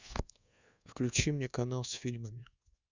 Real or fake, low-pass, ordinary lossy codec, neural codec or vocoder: fake; 7.2 kHz; Opus, 64 kbps; codec, 24 kHz, 1.2 kbps, DualCodec